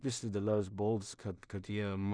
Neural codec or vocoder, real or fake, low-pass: codec, 16 kHz in and 24 kHz out, 0.4 kbps, LongCat-Audio-Codec, two codebook decoder; fake; 9.9 kHz